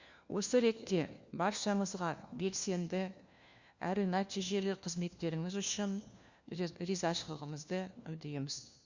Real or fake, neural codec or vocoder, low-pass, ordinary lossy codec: fake; codec, 16 kHz, 1 kbps, FunCodec, trained on LibriTTS, 50 frames a second; 7.2 kHz; Opus, 64 kbps